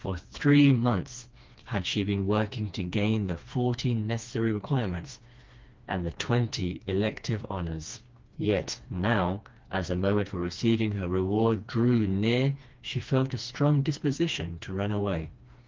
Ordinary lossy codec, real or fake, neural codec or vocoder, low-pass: Opus, 32 kbps; fake; codec, 16 kHz, 2 kbps, FreqCodec, smaller model; 7.2 kHz